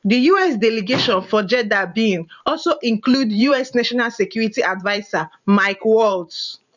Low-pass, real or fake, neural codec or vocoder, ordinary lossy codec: 7.2 kHz; fake; vocoder, 44.1 kHz, 128 mel bands every 512 samples, BigVGAN v2; none